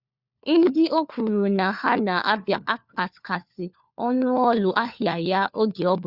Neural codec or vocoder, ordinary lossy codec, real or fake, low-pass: codec, 16 kHz, 4 kbps, FunCodec, trained on LibriTTS, 50 frames a second; Opus, 64 kbps; fake; 5.4 kHz